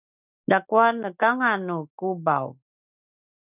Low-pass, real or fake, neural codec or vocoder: 3.6 kHz; real; none